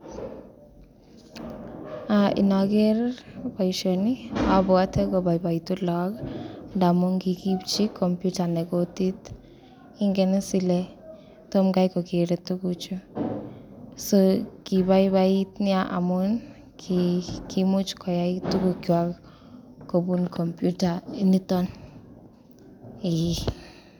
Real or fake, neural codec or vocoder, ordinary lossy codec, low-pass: real; none; none; 19.8 kHz